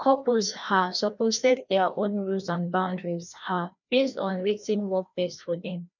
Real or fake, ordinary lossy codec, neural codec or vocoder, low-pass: fake; none; codec, 16 kHz, 1 kbps, FreqCodec, larger model; 7.2 kHz